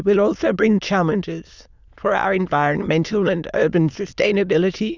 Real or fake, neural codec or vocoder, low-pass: fake; autoencoder, 22.05 kHz, a latent of 192 numbers a frame, VITS, trained on many speakers; 7.2 kHz